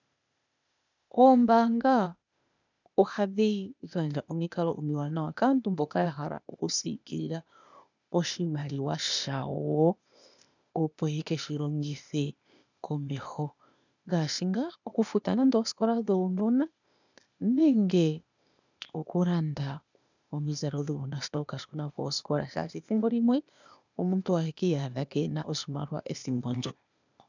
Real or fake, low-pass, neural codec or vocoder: fake; 7.2 kHz; codec, 16 kHz, 0.8 kbps, ZipCodec